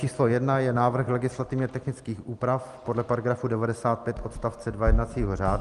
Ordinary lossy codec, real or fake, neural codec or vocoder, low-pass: Opus, 24 kbps; real; none; 10.8 kHz